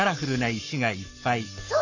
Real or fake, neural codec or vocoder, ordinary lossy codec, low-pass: fake; codec, 44.1 kHz, 7.8 kbps, Pupu-Codec; none; 7.2 kHz